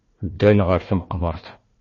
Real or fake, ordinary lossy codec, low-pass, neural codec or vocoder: fake; MP3, 32 kbps; 7.2 kHz; codec, 16 kHz, 1 kbps, FunCodec, trained on Chinese and English, 50 frames a second